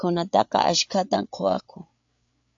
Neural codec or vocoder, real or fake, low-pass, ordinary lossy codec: codec, 16 kHz, 8 kbps, FreqCodec, larger model; fake; 7.2 kHz; AAC, 64 kbps